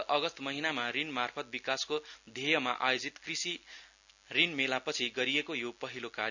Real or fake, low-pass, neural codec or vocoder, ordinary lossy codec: real; 7.2 kHz; none; none